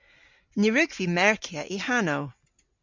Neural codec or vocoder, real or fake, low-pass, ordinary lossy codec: none; real; 7.2 kHz; MP3, 64 kbps